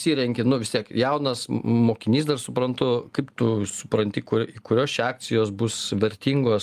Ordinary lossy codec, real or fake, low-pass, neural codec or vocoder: Opus, 32 kbps; real; 14.4 kHz; none